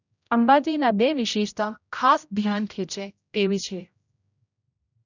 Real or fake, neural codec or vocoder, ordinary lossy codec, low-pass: fake; codec, 16 kHz, 0.5 kbps, X-Codec, HuBERT features, trained on general audio; none; 7.2 kHz